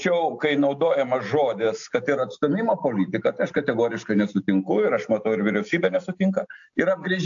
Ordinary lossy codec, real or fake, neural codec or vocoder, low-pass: AAC, 64 kbps; real; none; 7.2 kHz